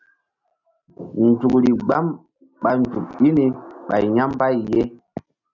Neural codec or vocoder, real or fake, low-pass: none; real; 7.2 kHz